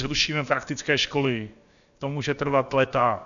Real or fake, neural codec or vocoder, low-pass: fake; codec, 16 kHz, about 1 kbps, DyCAST, with the encoder's durations; 7.2 kHz